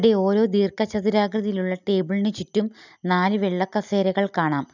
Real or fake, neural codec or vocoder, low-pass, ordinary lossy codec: real; none; 7.2 kHz; none